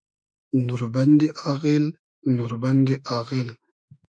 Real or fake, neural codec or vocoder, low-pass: fake; autoencoder, 48 kHz, 32 numbers a frame, DAC-VAE, trained on Japanese speech; 9.9 kHz